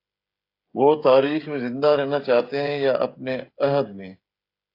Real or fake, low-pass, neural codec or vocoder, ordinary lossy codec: fake; 5.4 kHz; codec, 16 kHz, 16 kbps, FreqCodec, smaller model; AAC, 32 kbps